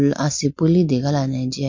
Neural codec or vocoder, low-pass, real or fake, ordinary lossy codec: none; 7.2 kHz; real; MP3, 48 kbps